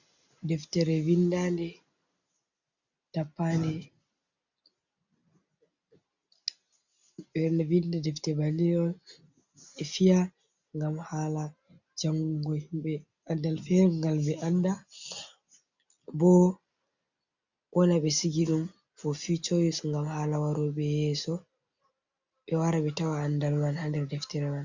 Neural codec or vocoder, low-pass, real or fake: none; 7.2 kHz; real